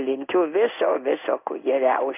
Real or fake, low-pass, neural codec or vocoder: fake; 3.6 kHz; codec, 16 kHz in and 24 kHz out, 1 kbps, XY-Tokenizer